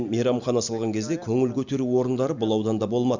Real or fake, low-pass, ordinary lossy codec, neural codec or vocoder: real; 7.2 kHz; Opus, 64 kbps; none